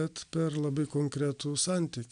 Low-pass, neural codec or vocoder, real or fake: 9.9 kHz; none; real